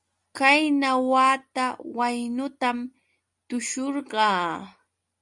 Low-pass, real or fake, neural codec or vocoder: 10.8 kHz; real; none